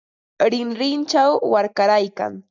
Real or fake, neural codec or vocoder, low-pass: real; none; 7.2 kHz